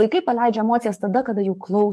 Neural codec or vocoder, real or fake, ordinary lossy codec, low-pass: codec, 44.1 kHz, 7.8 kbps, DAC; fake; MP3, 64 kbps; 14.4 kHz